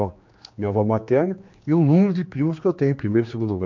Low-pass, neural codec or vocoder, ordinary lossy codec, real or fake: 7.2 kHz; codec, 16 kHz, 2 kbps, X-Codec, HuBERT features, trained on general audio; MP3, 48 kbps; fake